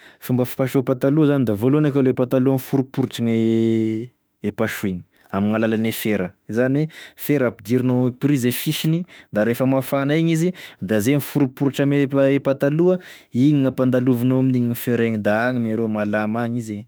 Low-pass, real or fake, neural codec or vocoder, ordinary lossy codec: none; fake; autoencoder, 48 kHz, 32 numbers a frame, DAC-VAE, trained on Japanese speech; none